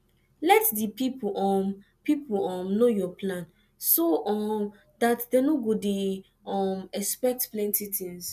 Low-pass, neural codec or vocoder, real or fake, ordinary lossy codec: 14.4 kHz; none; real; none